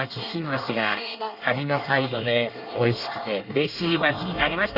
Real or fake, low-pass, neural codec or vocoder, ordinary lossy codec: fake; 5.4 kHz; codec, 24 kHz, 1 kbps, SNAC; none